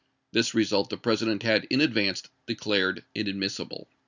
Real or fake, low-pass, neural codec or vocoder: real; 7.2 kHz; none